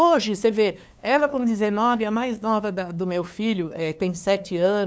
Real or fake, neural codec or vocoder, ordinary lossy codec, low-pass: fake; codec, 16 kHz, 2 kbps, FunCodec, trained on LibriTTS, 25 frames a second; none; none